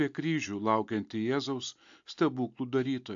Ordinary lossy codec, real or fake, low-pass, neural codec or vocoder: AAC, 64 kbps; real; 7.2 kHz; none